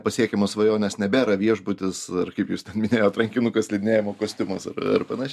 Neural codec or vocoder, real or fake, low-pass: none; real; 14.4 kHz